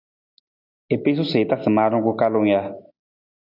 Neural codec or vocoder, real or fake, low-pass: none; real; 5.4 kHz